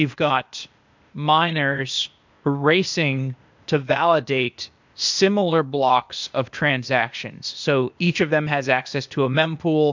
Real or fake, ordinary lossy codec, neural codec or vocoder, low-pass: fake; MP3, 64 kbps; codec, 16 kHz, 0.8 kbps, ZipCodec; 7.2 kHz